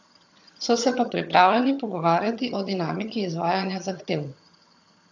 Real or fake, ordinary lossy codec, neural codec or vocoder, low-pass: fake; AAC, 48 kbps; vocoder, 22.05 kHz, 80 mel bands, HiFi-GAN; 7.2 kHz